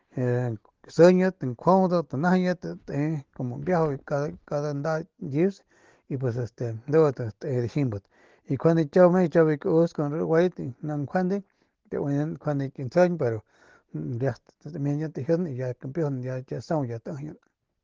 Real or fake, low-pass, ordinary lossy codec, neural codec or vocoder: real; 7.2 kHz; Opus, 16 kbps; none